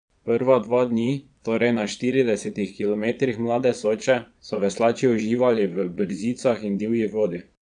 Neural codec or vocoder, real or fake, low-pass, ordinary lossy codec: vocoder, 22.05 kHz, 80 mel bands, Vocos; fake; 9.9 kHz; none